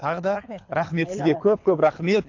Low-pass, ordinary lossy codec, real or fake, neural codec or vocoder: 7.2 kHz; AAC, 48 kbps; fake; codec, 24 kHz, 6 kbps, HILCodec